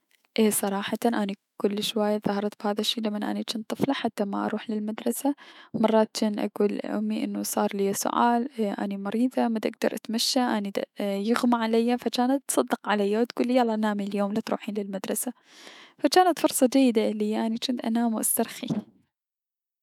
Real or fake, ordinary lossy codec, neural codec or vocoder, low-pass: fake; none; autoencoder, 48 kHz, 128 numbers a frame, DAC-VAE, trained on Japanese speech; 19.8 kHz